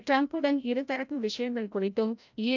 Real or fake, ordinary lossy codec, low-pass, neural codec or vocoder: fake; none; 7.2 kHz; codec, 16 kHz, 0.5 kbps, FreqCodec, larger model